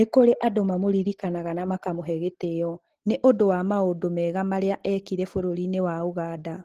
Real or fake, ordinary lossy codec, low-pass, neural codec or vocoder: real; Opus, 16 kbps; 14.4 kHz; none